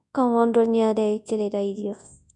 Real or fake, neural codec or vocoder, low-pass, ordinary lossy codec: fake; codec, 24 kHz, 0.9 kbps, WavTokenizer, large speech release; 10.8 kHz; none